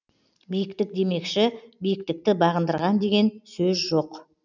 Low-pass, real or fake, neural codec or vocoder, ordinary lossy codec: 7.2 kHz; real; none; none